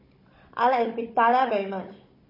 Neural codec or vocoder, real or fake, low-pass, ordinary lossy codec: codec, 16 kHz, 16 kbps, FunCodec, trained on Chinese and English, 50 frames a second; fake; 5.4 kHz; MP3, 24 kbps